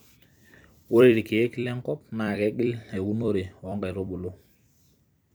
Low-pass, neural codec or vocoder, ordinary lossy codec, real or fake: none; vocoder, 44.1 kHz, 128 mel bands every 256 samples, BigVGAN v2; none; fake